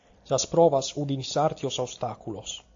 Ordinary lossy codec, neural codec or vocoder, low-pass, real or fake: AAC, 48 kbps; none; 7.2 kHz; real